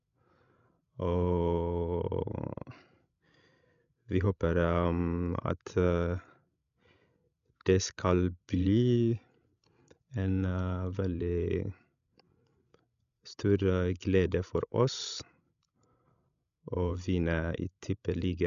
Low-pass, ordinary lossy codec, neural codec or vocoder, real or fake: 7.2 kHz; none; codec, 16 kHz, 16 kbps, FreqCodec, larger model; fake